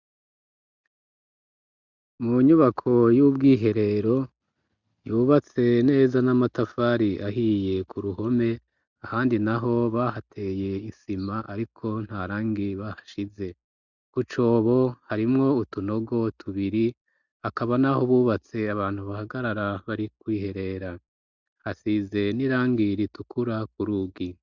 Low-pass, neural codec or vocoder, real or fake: 7.2 kHz; none; real